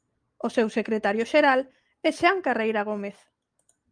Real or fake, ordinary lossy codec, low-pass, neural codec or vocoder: real; Opus, 32 kbps; 9.9 kHz; none